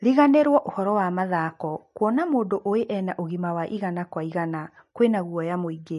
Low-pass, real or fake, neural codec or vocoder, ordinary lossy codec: 14.4 kHz; real; none; MP3, 48 kbps